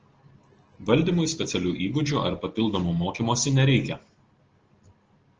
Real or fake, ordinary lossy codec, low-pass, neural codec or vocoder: real; Opus, 16 kbps; 7.2 kHz; none